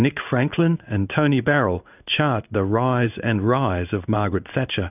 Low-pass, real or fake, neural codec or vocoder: 3.6 kHz; fake; codec, 16 kHz in and 24 kHz out, 1 kbps, XY-Tokenizer